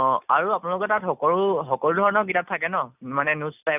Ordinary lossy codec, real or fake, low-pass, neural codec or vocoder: none; real; 3.6 kHz; none